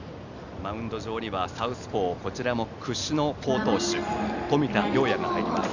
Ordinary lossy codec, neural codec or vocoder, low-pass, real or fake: none; none; 7.2 kHz; real